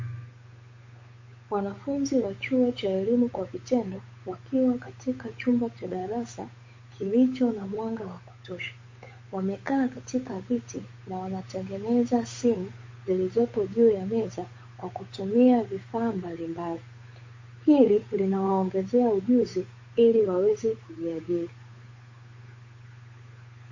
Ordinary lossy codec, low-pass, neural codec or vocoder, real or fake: MP3, 32 kbps; 7.2 kHz; codec, 16 kHz, 8 kbps, FunCodec, trained on Chinese and English, 25 frames a second; fake